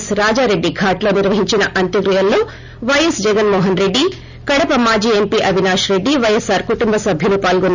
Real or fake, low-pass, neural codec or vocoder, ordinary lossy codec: real; 7.2 kHz; none; none